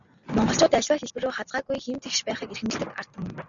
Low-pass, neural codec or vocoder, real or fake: 7.2 kHz; none; real